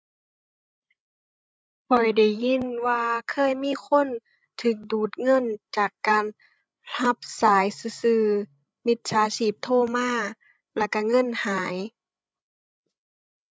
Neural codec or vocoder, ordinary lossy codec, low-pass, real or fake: codec, 16 kHz, 16 kbps, FreqCodec, larger model; none; none; fake